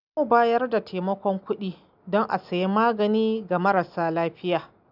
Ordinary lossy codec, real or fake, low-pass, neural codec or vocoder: none; real; 5.4 kHz; none